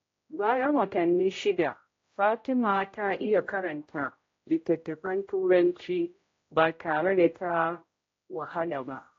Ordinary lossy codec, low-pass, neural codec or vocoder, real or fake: AAC, 32 kbps; 7.2 kHz; codec, 16 kHz, 0.5 kbps, X-Codec, HuBERT features, trained on general audio; fake